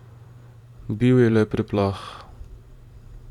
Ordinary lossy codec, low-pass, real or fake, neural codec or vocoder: none; 19.8 kHz; fake; vocoder, 44.1 kHz, 128 mel bands every 512 samples, BigVGAN v2